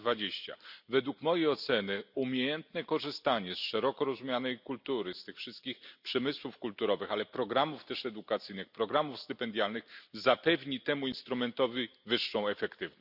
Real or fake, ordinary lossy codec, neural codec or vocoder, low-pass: real; none; none; 5.4 kHz